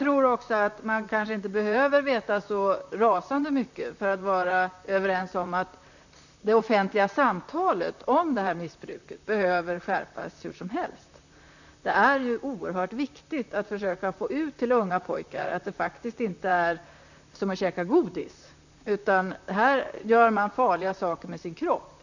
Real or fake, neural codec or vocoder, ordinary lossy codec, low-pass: fake; vocoder, 44.1 kHz, 128 mel bands, Pupu-Vocoder; none; 7.2 kHz